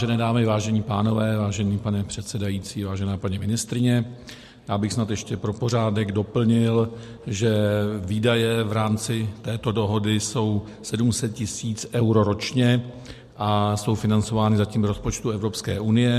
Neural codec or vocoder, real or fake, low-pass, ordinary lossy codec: none; real; 14.4 kHz; MP3, 64 kbps